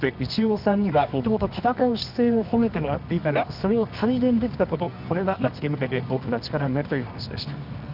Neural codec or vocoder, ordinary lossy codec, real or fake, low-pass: codec, 24 kHz, 0.9 kbps, WavTokenizer, medium music audio release; none; fake; 5.4 kHz